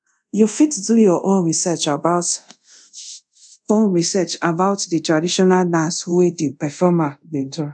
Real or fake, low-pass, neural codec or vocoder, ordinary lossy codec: fake; 9.9 kHz; codec, 24 kHz, 0.5 kbps, DualCodec; none